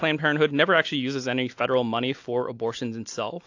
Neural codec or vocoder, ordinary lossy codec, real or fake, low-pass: none; AAC, 48 kbps; real; 7.2 kHz